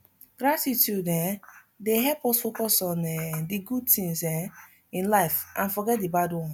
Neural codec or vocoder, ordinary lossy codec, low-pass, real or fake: none; none; none; real